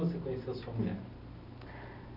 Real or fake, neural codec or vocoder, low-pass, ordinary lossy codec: real; none; 5.4 kHz; none